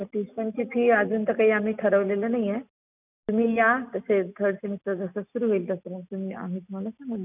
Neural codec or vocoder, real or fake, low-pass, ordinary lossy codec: none; real; 3.6 kHz; none